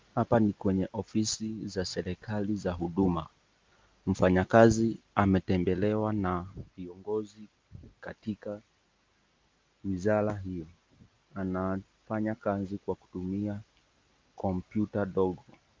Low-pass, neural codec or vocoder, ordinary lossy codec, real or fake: 7.2 kHz; none; Opus, 32 kbps; real